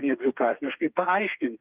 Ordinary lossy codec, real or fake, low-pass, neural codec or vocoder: Opus, 24 kbps; fake; 3.6 kHz; codec, 32 kHz, 1.9 kbps, SNAC